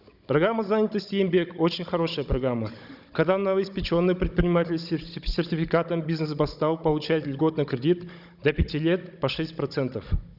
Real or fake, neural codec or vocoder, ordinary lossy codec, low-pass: fake; codec, 16 kHz, 16 kbps, FunCodec, trained on Chinese and English, 50 frames a second; none; 5.4 kHz